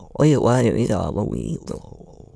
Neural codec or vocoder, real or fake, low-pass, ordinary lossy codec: autoencoder, 22.05 kHz, a latent of 192 numbers a frame, VITS, trained on many speakers; fake; none; none